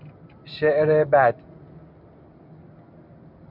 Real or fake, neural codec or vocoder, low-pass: real; none; 5.4 kHz